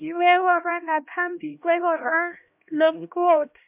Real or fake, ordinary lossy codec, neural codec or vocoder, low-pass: fake; MP3, 32 kbps; codec, 16 kHz, 1 kbps, X-Codec, HuBERT features, trained on LibriSpeech; 3.6 kHz